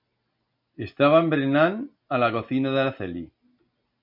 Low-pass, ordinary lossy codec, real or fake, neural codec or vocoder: 5.4 kHz; MP3, 48 kbps; real; none